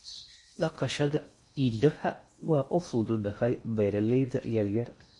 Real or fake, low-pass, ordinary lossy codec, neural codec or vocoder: fake; 10.8 kHz; MP3, 48 kbps; codec, 16 kHz in and 24 kHz out, 0.6 kbps, FocalCodec, streaming, 4096 codes